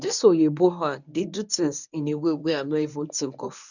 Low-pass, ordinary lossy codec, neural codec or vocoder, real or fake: 7.2 kHz; none; codec, 24 kHz, 0.9 kbps, WavTokenizer, medium speech release version 1; fake